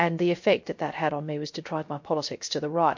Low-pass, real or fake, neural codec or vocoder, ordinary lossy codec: 7.2 kHz; fake; codec, 16 kHz, 0.3 kbps, FocalCodec; MP3, 48 kbps